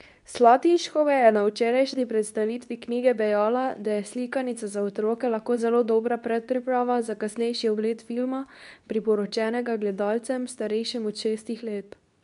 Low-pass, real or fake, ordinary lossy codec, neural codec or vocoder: 10.8 kHz; fake; none; codec, 24 kHz, 0.9 kbps, WavTokenizer, medium speech release version 2